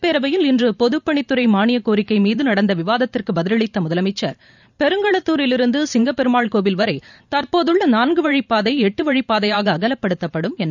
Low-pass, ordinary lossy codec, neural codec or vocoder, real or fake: 7.2 kHz; none; vocoder, 44.1 kHz, 128 mel bands every 512 samples, BigVGAN v2; fake